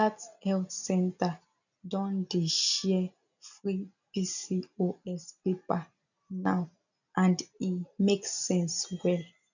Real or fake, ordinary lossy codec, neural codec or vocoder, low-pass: real; none; none; 7.2 kHz